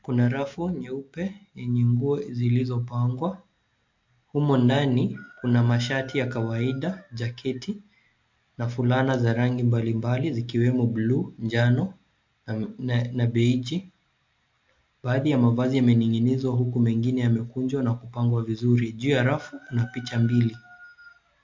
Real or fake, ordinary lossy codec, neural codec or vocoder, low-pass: real; MP3, 48 kbps; none; 7.2 kHz